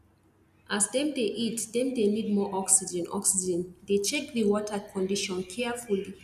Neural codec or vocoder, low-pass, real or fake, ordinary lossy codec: none; 14.4 kHz; real; none